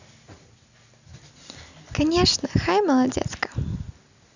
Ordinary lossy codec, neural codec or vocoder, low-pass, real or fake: none; none; 7.2 kHz; real